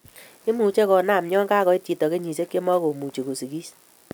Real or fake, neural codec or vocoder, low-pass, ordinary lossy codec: real; none; none; none